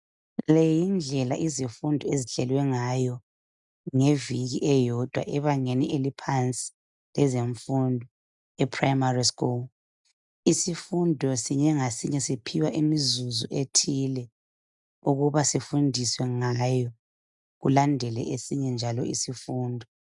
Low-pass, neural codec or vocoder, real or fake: 10.8 kHz; none; real